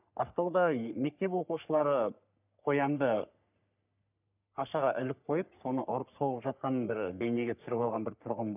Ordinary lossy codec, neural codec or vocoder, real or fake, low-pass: none; codec, 44.1 kHz, 3.4 kbps, Pupu-Codec; fake; 3.6 kHz